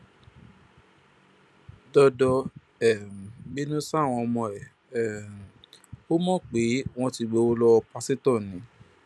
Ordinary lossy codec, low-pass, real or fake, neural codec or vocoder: none; none; real; none